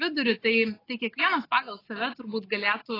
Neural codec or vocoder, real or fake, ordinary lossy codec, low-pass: none; real; AAC, 24 kbps; 5.4 kHz